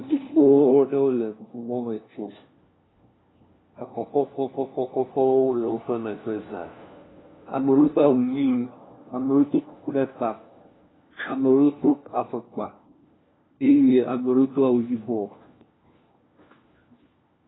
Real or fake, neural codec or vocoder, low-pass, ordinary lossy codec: fake; codec, 16 kHz, 1 kbps, FunCodec, trained on LibriTTS, 50 frames a second; 7.2 kHz; AAC, 16 kbps